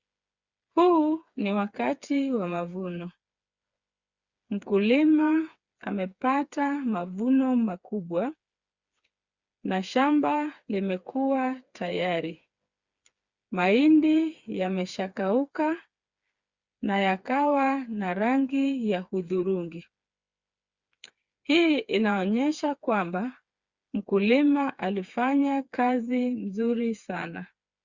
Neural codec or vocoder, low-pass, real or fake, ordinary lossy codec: codec, 16 kHz, 4 kbps, FreqCodec, smaller model; 7.2 kHz; fake; Opus, 64 kbps